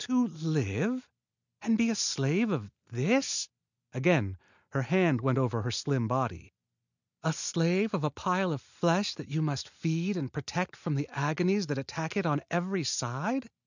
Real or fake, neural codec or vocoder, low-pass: real; none; 7.2 kHz